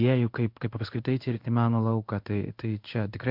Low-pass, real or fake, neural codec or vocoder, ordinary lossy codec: 5.4 kHz; fake; codec, 16 kHz in and 24 kHz out, 1 kbps, XY-Tokenizer; MP3, 48 kbps